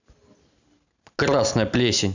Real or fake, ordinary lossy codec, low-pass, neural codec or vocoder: real; none; 7.2 kHz; none